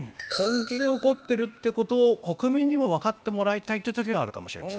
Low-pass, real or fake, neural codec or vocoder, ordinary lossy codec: none; fake; codec, 16 kHz, 0.8 kbps, ZipCodec; none